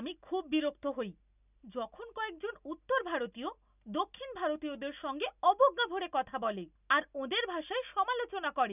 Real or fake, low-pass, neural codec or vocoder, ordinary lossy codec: real; 3.6 kHz; none; none